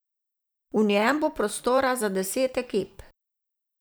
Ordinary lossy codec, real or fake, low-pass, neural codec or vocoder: none; fake; none; vocoder, 44.1 kHz, 128 mel bands every 512 samples, BigVGAN v2